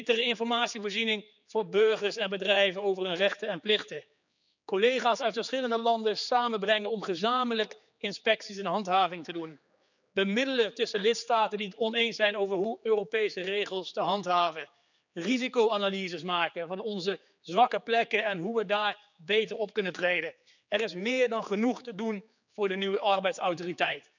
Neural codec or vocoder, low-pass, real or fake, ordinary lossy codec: codec, 16 kHz, 4 kbps, X-Codec, HuBERT features, trained on general audio; 7.2 kHz; fake; none